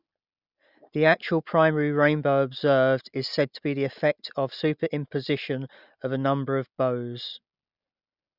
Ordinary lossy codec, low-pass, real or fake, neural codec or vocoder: none; 5.4 kHz; real; none